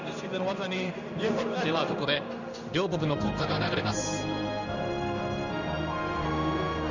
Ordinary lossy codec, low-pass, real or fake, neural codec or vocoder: none; 7.2 kHz; fake; codec, 16 kHz in and 24 kHz out, 1 kbps, XY-Tokenizer